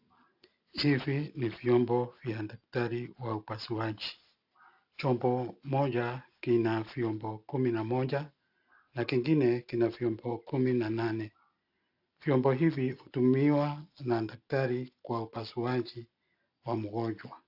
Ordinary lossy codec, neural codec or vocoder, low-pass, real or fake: AAC, 48 kbps; none; 5.4 kHz; real